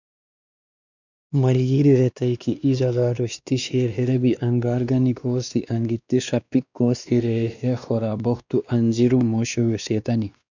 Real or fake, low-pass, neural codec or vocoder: fake; 7.2 kHz; codec, 16 kHz, 2 kbps, X-Codec, WavLM features, trained on Multilingual LibriSpeech